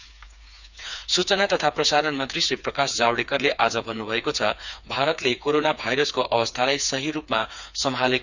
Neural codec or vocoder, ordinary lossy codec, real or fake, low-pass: codec, 16 kHz, 4 kbps, FreqCodec, smaller model; none; fake; 7.2 kHz